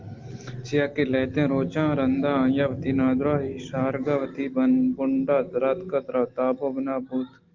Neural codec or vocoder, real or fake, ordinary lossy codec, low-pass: none; real; Opus, 32 kbps; 7.2 kHz